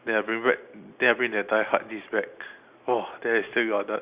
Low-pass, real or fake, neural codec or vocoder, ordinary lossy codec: 3.6 kHz; real; none; Opus, 64 kbps